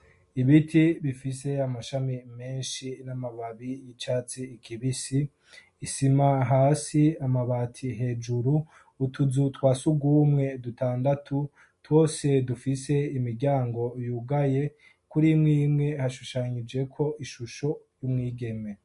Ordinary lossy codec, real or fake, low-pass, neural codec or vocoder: MP3, 48 kbps; real; 14.4 kHz; none